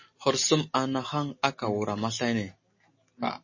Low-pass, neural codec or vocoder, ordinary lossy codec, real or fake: 7.2 kHz; none; MP3, 32 kbps; real